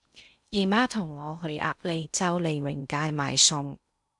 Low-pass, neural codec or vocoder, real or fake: 10.8 kHz; codec, 16 kHz in and 24 kHz out, 0.6 kbps, FocalCodec, streaming, 4096 codes; fake